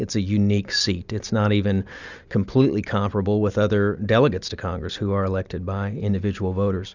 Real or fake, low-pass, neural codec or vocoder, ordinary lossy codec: real; 7.2 kHz; none; Opus, 64 kbps